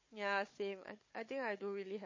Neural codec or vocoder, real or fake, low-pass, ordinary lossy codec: codec, 16 kHz, 4 kbps, FunCodec, trained on Chinese and English, 50 frames a second; fake; 7.2 kHz; MP3, 32 kbps